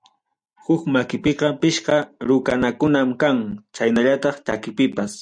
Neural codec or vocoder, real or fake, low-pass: none; real; 9.9 kHz